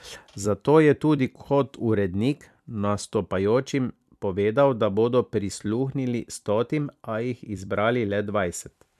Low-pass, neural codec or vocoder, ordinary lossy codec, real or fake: 14.4 kHz; vocoder, 44.1 kHz, 128 mel bands every 256 samples, BigVGAN v2; MP3, 96 kbps; fake